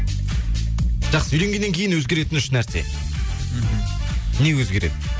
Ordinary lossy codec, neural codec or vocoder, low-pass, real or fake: none; none; none; real